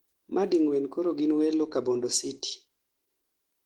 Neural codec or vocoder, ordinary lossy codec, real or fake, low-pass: autoencoder, 48 kHz, 128 numbers a frame, DAC-VAE, trained on Japanese speech; Opus, 16 kbps; fake; 19.8 kHz